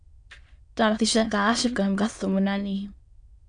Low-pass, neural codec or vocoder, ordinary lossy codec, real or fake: 9.9 kHz; autoencoder, 22.05 kHz, a latent of 192 numbers a frame, VITS, trained on many speakers; MP3, 64 kbps; fake